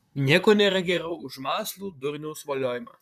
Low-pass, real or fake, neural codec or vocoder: 14.4 kHz; fake; vocoder, 44.1 kHz, 128 mel bands, Pupu-Vocoder